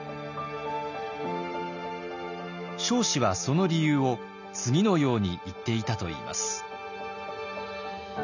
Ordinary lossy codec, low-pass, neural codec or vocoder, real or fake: none; 7.2 kHz; none; real